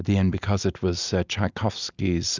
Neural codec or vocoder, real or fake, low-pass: none; real; 7.2 kHz